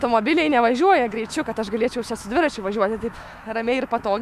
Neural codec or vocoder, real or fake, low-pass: autoencoder, 48 kHz, 128 numbers a frame, DAC-VAE, trained on Japanese speech; fake; 14.4 kHz